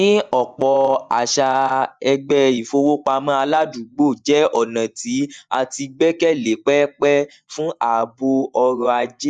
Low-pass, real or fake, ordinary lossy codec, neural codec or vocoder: 9.9 kHz; fake; none; vocoder, 24 kHz, 100 mel bands, Vocos